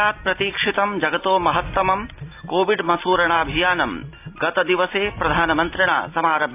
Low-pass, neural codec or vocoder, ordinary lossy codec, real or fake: 3.6 kHz; none; AAC, 32 kbps; real